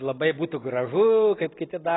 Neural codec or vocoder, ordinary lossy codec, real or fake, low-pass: none; AAC, 16 kbps; real; 7.2 kHz